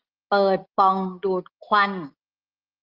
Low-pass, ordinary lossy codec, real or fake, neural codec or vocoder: 5.4 kHz; Opus, 32 kbps; real; none